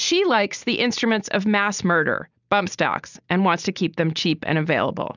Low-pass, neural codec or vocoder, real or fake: 7.2 kHz; none; real